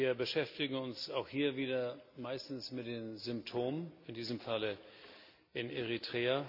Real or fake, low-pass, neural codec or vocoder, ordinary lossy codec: real; 5.4 kHz; none; none